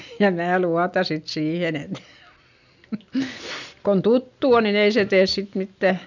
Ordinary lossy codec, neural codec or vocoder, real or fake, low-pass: none; none; real; 7.2 kHz